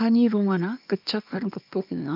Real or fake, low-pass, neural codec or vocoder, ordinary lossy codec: fake; 5.4 kHz; codec, 24 kHz, 0.9 kbps, WavTokenizer, small release; MP3, 48 kbps